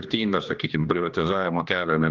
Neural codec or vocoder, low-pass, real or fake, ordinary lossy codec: codec, 16 kHz, 1 kbps, X-Codec, HuBERT features, trained on general audio; 7.2 kHz; fake; Opus, 32 kbps